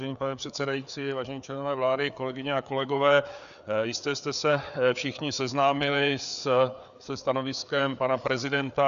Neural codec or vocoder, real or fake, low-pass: codec, 16 kHz, 4 kbps, FreqCodec, larger model; fake; 7.2 kHz